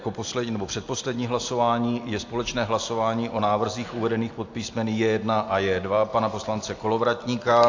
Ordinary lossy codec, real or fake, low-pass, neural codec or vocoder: AAC, 48 kbps; real; 7.2 kHz; none